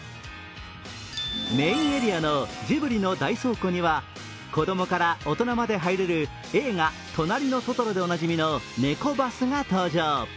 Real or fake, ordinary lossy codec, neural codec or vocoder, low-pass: real; none; none; none